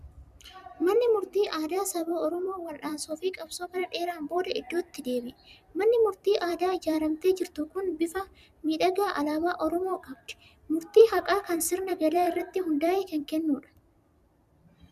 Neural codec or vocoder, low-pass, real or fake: vocoder, 44.1 kHz, 128 mel bands every 256 samples, BigVGAN v2; 14.4 kHz; fake